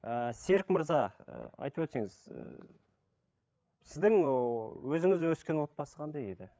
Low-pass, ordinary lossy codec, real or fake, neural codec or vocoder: none; none; fake; codec, 16 kHz, 8 kbps, FreqCodec, larger model